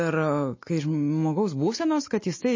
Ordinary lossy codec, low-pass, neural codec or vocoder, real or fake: MP3, 32 kbps; 7.2 kHz; none; real